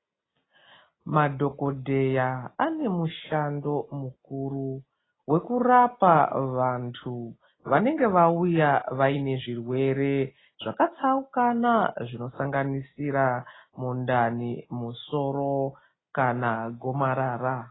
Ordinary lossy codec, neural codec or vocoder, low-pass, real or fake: AAC, 16 kbps; none; 7.2 kHz; real